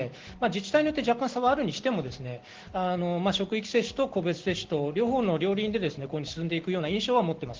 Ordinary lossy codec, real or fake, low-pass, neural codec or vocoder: Opus, 16 kbps; real; 7.2 kHz; none